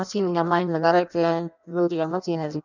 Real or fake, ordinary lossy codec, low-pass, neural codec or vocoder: fake; none; 7.2 kHz; codec, 16 kHz in and 24 kHz out, 0.6 kbps, FireRedTTS-2 codec